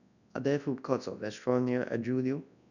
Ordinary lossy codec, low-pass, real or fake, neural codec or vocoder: none; 7.2 kHz; fake; codec, 24 kHz, 0.9 kbps, WavTokenizer, large speech release